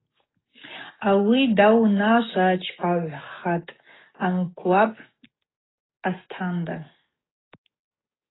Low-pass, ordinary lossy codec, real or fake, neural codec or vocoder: 7.2 kHz; AAC, 16 kbps; fake; codec, 44.1 kHz, 7.8 kbps, DAC